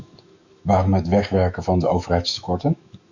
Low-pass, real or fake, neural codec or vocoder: 7.2 kHz; fake; autoencoder, 48 kHz, 128 numbers a frame, DAC-VAE, trained on Japanese speech